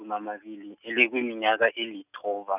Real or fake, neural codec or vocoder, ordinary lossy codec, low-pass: real; none; none; 3.6 kHz